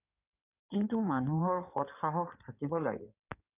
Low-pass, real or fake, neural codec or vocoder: 3.6 kHz; fake; codec, 16 kHz in and 24 kHz out, 2.2 kbps, FireRedTTS-2 codec